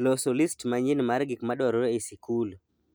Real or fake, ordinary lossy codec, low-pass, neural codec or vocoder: real; none; none; none